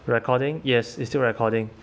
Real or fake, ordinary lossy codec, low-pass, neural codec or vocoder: real; none; none; none